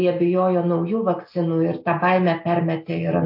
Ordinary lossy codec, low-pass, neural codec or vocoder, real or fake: MP3, 32 kbps; 5.4 kHz; none; real